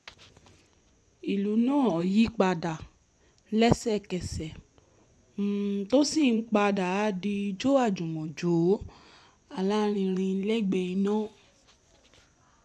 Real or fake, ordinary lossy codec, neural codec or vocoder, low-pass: fake; none; vocoder, 24 kHz, 100 mel bands, Vocos; none